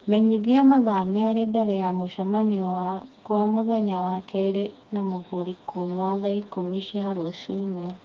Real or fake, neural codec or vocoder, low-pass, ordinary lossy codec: fake; codec, 16 kHz, 2 kbps, FreqCodec, smaller model; 7.2 kHz; Opus, 32 kbps